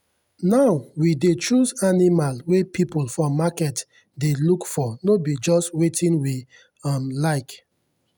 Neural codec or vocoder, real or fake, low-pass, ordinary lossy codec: none; real; none; none